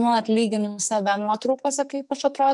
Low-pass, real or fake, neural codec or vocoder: 10.8 kHz; fake; codec, 44.1 kHz, 2.6 kbps, SNAC